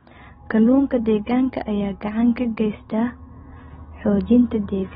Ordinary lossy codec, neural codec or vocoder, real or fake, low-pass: AAC, 16 kbps; none; real; 7.2 kHz